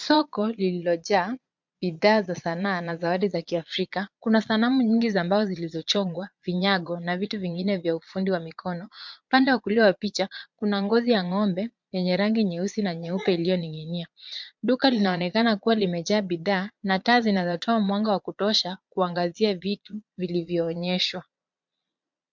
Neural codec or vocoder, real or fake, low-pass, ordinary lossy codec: vocoder, 22.05 kHz, 80 mel bands, Vocos; fake; 7.2 kHz; MP3, 64 kbps